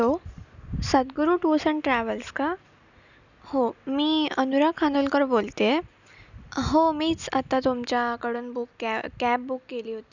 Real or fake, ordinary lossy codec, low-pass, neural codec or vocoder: real; none; 7.2 kHz; none